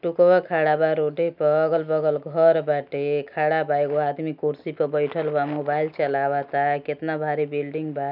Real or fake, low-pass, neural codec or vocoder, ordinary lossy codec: real; 5.4 kHz; none; none